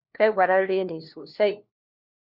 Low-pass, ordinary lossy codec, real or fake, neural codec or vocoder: 5.4 kHz; Opus, 64 kbps; fake; codec, 16 kHz, 1 kbps, FunCodec, trained on LibriTTS, 50 frames a second